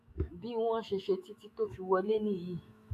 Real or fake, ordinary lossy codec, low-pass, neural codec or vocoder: fake; AAC, 64 kbps; 14.4 kHz; autoencoder, 48 kHz, 128 numbers a frame, DAC-VAE, trained on Japanese speech